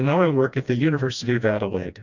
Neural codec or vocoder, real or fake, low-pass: codec, 16 kHz, 1 kbps, FreqCodec, smaller model; fake; 7.2 kHz